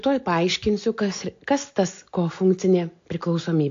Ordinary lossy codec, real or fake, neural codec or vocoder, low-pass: AAC, 48 kbps; real; none; 7.2 kHz